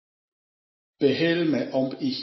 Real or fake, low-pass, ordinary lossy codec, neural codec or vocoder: real; 7.2 kHz; MP3, 24 kbps; none